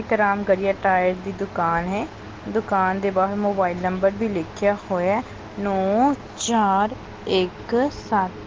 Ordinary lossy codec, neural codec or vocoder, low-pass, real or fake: Opus, 16 kbps; none; 7.2 kHz; real